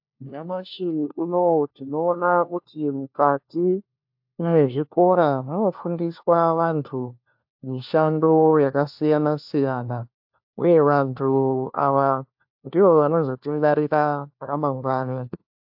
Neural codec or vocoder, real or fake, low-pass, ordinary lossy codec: codec, 16 kHz, 1 kbps, FunCodec, trained on LibriTTS, 50 frames a second; fake; 5.4 kHz; AAC, 48 kbps